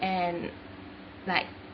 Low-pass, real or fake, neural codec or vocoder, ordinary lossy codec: 7.2 kHz; real; none; MP3, 24 kbps